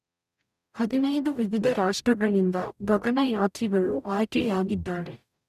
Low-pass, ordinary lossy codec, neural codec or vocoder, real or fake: 14.4 kHz; none; codec, 44.1 kHz, 0.9 kbps, DAC; fake